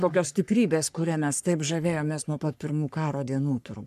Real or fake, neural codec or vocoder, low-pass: fake; codec, 44.1 kHz, 3.4 kbps, Pupu-Codec; 14.4 kHz